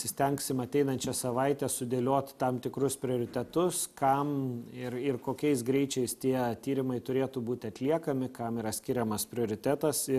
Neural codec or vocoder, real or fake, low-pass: none; real; 14.4 kHz